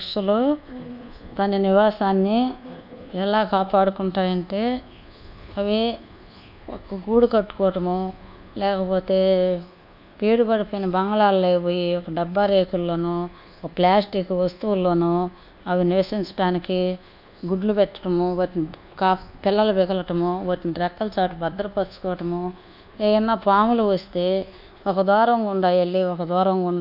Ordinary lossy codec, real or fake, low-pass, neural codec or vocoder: none; fake; 5.4 kHz; codec, 24 kHz, 1.2 kbps, DualCodec